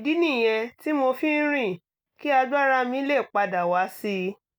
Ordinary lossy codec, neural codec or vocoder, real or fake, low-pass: none; none; real; none